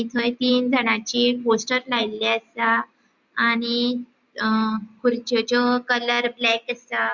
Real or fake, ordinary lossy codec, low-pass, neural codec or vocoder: real; Opus, 64 kbps; 7.2 kHz; none